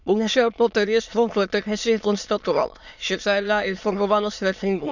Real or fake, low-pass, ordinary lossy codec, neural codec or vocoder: fake; 7.2 kHz; none; autoencoder, 22.05 kHz, a latent of 192 numbers a frame, VITS, trained on many speakers